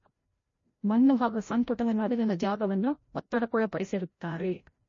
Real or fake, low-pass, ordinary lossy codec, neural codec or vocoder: fake; 7.2 kHz; MP3, 32 kbps; codec, 16 kHz, 0.5 kbps, FreqCodec, larger model